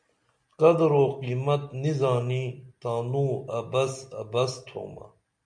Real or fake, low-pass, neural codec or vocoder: real; 9.9 kHz; none